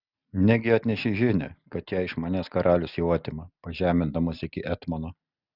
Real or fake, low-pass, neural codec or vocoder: real; 5.4 kHz; none